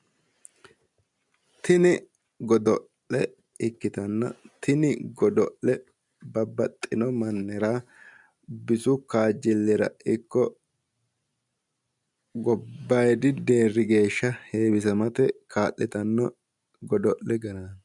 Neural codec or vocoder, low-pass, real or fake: none; 10.8 kHz; real